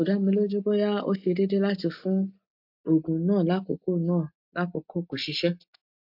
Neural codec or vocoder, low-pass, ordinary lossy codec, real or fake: none; 5.4 kHz; MP3, 48 kbps; real